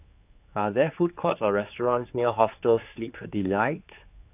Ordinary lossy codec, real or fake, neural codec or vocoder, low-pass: AAC, 32 kbps; fake; codec, 16 kHz, 4 kbps, X-Codec, HuBERT features, trained on general audio; 3.6 kHz